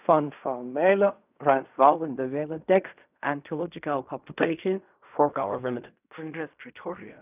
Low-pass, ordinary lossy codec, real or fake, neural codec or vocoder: 3.6 kHz; none; fake; codec, 16 kHz in and 24 kHz out, 0.4 kbps, LongCat-Audio-Codec, fine tuned four codebook decoder